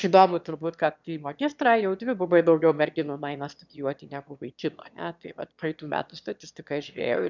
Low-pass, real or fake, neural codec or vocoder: 7.2 kHz; fake; autoencoder, 22.05 kHz, a latent of 192 numbers a frame, VITS, trained on one speaker